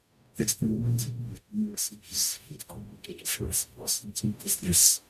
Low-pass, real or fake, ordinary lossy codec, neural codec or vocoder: 14.4 kHz; fake; none; codec, 44.1 kHz, 0.9 kbps, DAC